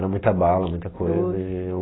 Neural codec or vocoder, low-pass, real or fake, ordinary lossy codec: none; 7.2 kHz; real; AAC, 16 kbps